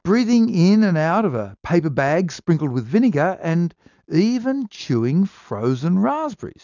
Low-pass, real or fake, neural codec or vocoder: 7.2 kHz; real; none